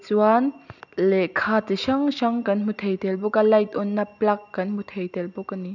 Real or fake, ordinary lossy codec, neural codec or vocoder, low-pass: real; none; none; 7.2 kHz